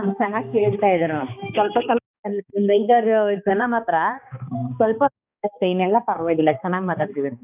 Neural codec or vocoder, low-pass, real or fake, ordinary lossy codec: codec, 16 kHz, 2 kbps, X-Codec, HuBERT features, trained on balanced general audio; 3.6 kHz; fake; none